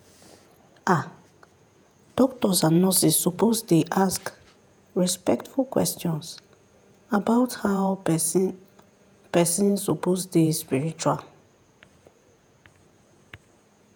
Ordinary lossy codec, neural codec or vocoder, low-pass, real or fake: none; vocoder, 48 kHz, 128 mel bands, Vocos; none; fake